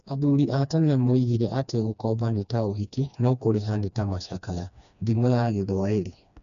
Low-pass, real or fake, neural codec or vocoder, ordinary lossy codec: 7.2 kHz; fake; codec, 16 kHz, 2 kbps, FreqCodec, smaller model; none